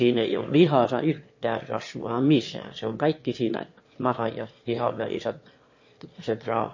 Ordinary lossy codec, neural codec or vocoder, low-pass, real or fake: MP3, 32 kbps; autoencoder, 22.05 kHz, a latent of 192 numbers a frame, VITS, trained on one speaker; 7.2 kHz; fake